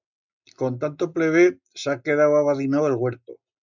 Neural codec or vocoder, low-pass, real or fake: none; 7.2 kHz; real